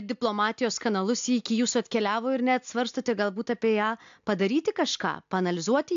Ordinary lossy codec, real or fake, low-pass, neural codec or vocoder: AAC, 64 kbps; real; 7.2 kHz; none